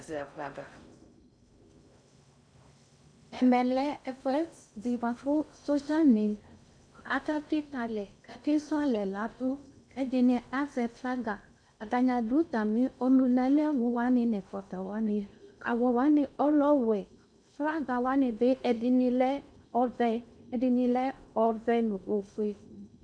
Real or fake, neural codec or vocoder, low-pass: fake; codec, 16 kHz in and 24 kHz out, 0.6 kbps, FocalCodec, streaming, 4096 codes; 9.9 kHz